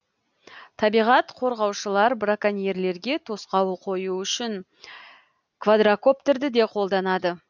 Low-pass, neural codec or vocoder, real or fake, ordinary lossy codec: 7.2 kHz; none; real; none